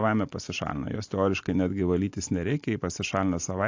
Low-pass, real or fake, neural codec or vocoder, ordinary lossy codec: 7.2 kHz; real; none; AAC, 48 kbps